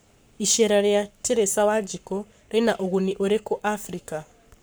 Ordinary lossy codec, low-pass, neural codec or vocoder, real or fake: none; none; codec, 44.1 kHz, 7.8 kbps, Pupu-Codec; fake